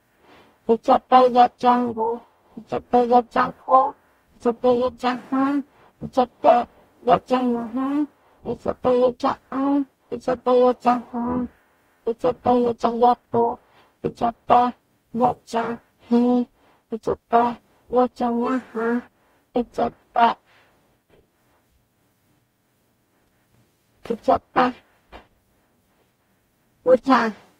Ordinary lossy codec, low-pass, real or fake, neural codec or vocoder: AAC, 48 kbps; 19.8 kHz; fake; codec, 44.1 kHz, 0.9 kbps, DAC